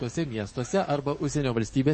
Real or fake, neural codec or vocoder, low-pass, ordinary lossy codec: fake; codec, 44.1 kHz, 7.8 kbps, DAC; 9.9 kHz; MP3, 32 kbps